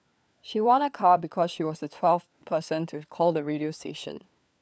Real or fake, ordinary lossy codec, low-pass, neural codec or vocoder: fake; none; none; codec, 16 kHz, 4 kbps, FunCodec, trained on LibriTTS, 50 frames a second